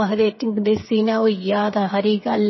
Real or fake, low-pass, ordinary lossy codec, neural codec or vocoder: fake; 7.2 kHz; MP3, 24 kbps; codec, 16 kHz, 8 kbps, FreqCodec, smaller model